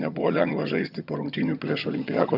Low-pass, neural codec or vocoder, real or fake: 5.4 kHz; vocoder, 22.05 kHz, 80 mel bands, HiFi-GAN; fake